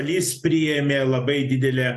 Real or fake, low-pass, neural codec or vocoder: real; 14.4 kHz; none